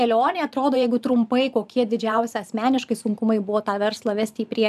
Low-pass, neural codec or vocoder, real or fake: 14.4 kHz; vocoder, 44.1 kHz, 128 mel bands every 512 samples, BigVGAN v2; fake